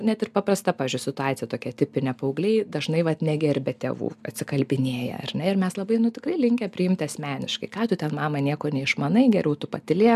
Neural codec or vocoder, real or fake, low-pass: none; real; 14.4 kHz